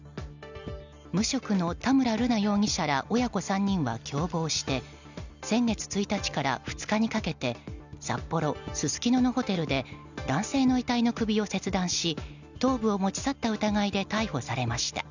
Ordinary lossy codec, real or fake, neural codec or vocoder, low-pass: none; real; none; 7.2 kHz